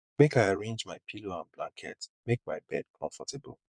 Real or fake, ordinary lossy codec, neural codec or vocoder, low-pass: fake; MP3, 96 kbps; vocoder, 22.05 kHz, 80 mel bands, Vocos; 9.9 kHz